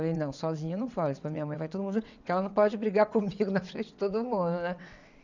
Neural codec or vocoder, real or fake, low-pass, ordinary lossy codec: vocoder, 22.05 kHz, 80 mel bands, WaveNeXt; fake; 7.2 kHz; none